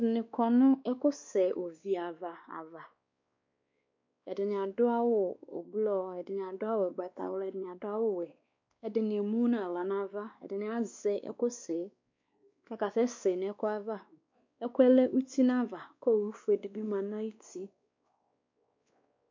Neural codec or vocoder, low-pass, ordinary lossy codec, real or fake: codec, 16 kHz, 2 kbps, X-Codec, WavLM features, trained on Multilingual LibriSpeech; 7.2 kHz; MP3, 64 kbps; fake